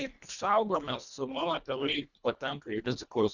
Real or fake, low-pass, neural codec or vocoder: fake; 7.2 kHz; codec, 24 kHz, 1.5 kbps, HILCodec